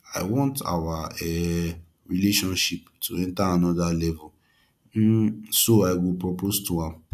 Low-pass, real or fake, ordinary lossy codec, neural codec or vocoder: 14.4 kHz; real; none; none